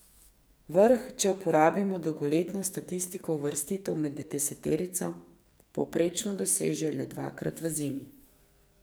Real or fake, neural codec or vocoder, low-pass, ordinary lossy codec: fake; codec, 44.1 kHz, 2.6 kbps, SNAC; none; none